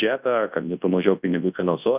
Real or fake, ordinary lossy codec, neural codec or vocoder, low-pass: fake; Opus, 24 kbps; codec, 24 kHz, 0.9 kbps, WavTokenizer, large speech release; 3.6 kHz